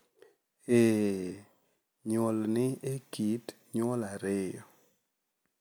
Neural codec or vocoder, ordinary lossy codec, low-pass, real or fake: none; none; none; real